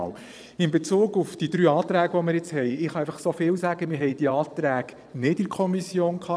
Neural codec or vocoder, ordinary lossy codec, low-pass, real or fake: vocoder, 22.05 kHz, 80 mel bands, Vocos; none; none; fake